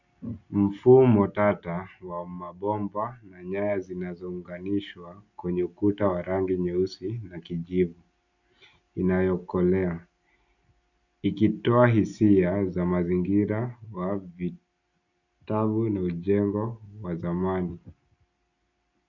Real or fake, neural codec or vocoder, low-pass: real; none; 7.2 kHz